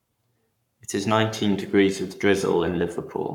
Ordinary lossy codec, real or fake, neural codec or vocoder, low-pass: none; fake; codec, 44.1 kHz, 7.8 kbps, Pupu-Codec; 19.8 kHz